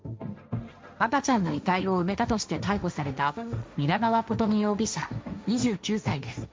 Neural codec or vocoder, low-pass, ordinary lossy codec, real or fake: codec, 16 kHz, 1.1 kbps, Voila-Tokenizer; none; none; fake